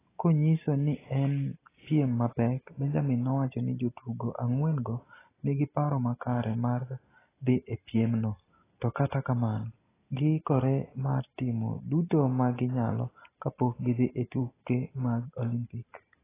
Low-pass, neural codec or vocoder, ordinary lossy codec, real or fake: 3.6 kHz; none; AAC, 16 kbps; real